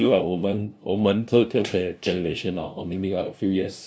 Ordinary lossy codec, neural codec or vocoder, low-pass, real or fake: none; codec, 16 kHz, 0.5 kbps, FunCodec, trained on LibriTTS, 25 frames a second; none; fake